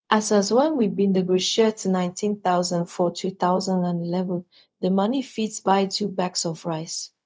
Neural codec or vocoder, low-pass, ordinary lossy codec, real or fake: codec, 16 kHz, 0.4 kbps, LongCat-Audio-Codec; none; none; fake